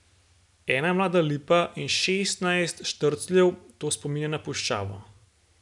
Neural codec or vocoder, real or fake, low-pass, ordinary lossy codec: none; real; 10.8 kHz; none